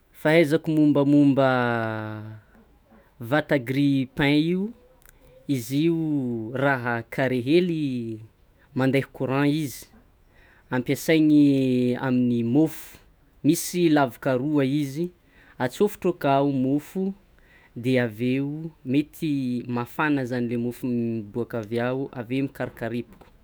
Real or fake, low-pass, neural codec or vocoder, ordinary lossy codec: fake; none; autoencoder, 48 kHz, 128 numbers a frame, DAC-VAE, trained on Japanese speech; none